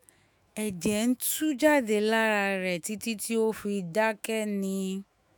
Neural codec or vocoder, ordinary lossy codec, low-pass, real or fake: autoencoder, 48 kHz, 128 numbers a frame, DAC-VAE, trained on Japanese speech; none; none; fake